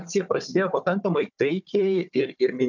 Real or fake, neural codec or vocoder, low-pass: fake; codec, 16 kHz, 4 kbps, FunCodec, trained on Chinese and English, 50 frames a second; 7.2 kHz